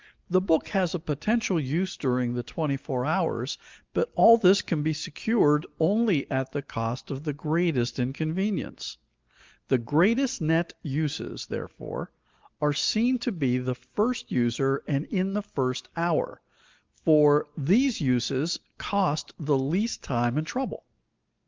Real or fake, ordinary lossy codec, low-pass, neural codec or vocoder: real; Opus, 24 kbps; 7.2 kHz; none